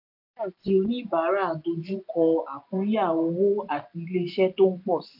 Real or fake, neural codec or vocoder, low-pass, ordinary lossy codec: real; none; 5.4 kHz; AAC, 48 kbps